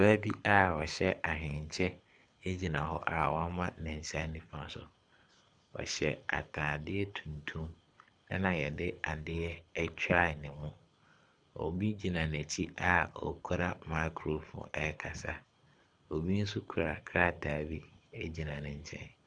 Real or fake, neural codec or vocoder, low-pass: fake; codec, 24 kHz, 6 kbps, HILCodec; 9.9 kHz